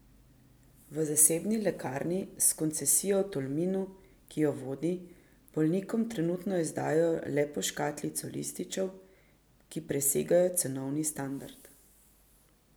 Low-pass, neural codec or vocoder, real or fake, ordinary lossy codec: none; none; real; none